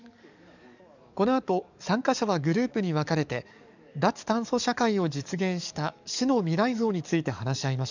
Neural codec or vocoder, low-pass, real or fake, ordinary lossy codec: codec, 44.1 kHz, 7.8 kbps, DAC; 7.2 kHz; fake; none